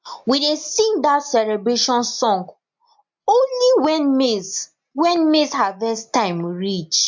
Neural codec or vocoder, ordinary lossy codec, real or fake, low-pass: none; MP3, 48 kbps; real; 7.2 kHz